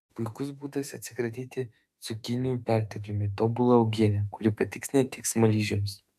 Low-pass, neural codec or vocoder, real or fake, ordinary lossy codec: 14.4 kHz; autoencoder, 48 kHz, 32 numbers a frame, DAC-VAE, trained on Japanese speech; fake; AAC, 96 kbps